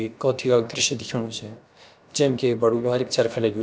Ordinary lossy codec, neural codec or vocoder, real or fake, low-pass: none; codec, 16 kHz, about 1 kbps, DyCAST, with the encoder's durations; fake; none